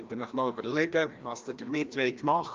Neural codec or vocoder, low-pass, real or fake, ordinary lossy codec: codec, 16 kHz, 1 kbps, FreqCodec, larger model; 7.2 kHz; fake; Opus, 32 kbps